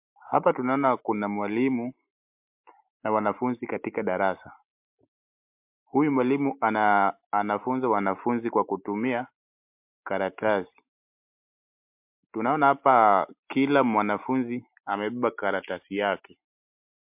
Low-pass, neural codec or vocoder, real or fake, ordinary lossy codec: 3.6 kHz; none; real; MP3, 32 kbps